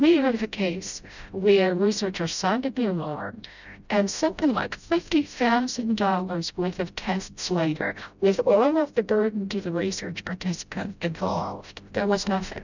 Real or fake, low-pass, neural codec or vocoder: fake; 7.2 kHz; codec, 16 kHz, 0.5 kbps, FreqCodec, smaller model